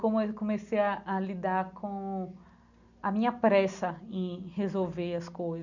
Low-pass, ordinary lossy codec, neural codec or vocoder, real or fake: 7.2 kHz; none; none; real